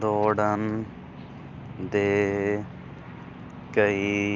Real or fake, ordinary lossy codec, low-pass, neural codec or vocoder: real; Opus, 32 kbps; 7.2 kHz; none